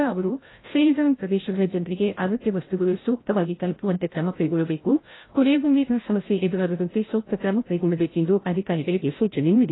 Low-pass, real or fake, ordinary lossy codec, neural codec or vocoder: 7.2 kHz; fake; AAC, 16 kbps; codec, 16 kHz, 0.5 kbps, FreqCodec, larger model